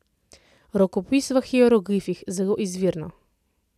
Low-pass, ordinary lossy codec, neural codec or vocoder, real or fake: 14.4 kHz; none; none; real